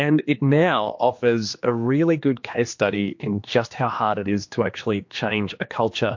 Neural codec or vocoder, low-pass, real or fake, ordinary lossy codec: codec, 16 kHz, 2 kbps, X-Codec, HuBERT features, trained on general audio; 7.2 kHz; fake; MP3, 48 kbps